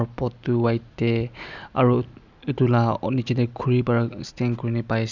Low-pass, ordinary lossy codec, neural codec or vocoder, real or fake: 7.2 kHz; none; none; real